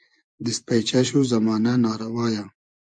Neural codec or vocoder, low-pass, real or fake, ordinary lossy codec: vocoder, 24 kHz, 100 mel bands, Vocos; 9.9 kHz; fake; MP3, 64 kbps